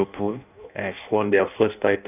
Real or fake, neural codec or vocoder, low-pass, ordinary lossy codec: fake; codec, 16 kHz in and 24 kHz out, 0.6 kbps, FireRedTTS-2 codec; 3.6 kHz; none